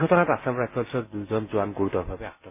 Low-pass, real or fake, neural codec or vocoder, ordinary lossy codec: 3.6 kHz; real; none; MP3, 24 kbps